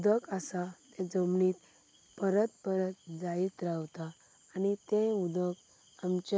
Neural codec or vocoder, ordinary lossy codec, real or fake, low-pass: none; none; real; none